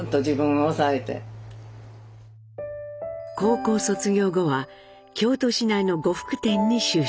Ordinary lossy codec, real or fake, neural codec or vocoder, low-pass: none; real; none; none